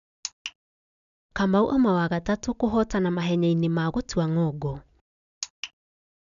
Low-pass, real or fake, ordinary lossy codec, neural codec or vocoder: 7.2 kHz; real; none; none